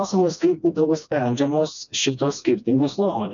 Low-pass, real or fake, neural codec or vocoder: 7.2 kHz; fake; codec, 16 kHz, 1 kbps, FreqCodec, smaller model